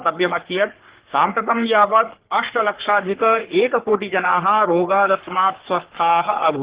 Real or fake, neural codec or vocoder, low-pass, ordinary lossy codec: fake; codec, 44.1 kHz, 3.4 kbps, Pupu-Codec; 3.6 kHz; Opus, 16 kbps